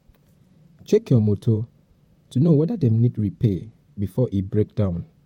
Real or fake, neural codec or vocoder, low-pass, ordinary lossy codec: fake; vocoder, 44.1 kHz, 128 mel bands, Pupu-Vocoder; 19.8 kHz; MP3, 64 kbps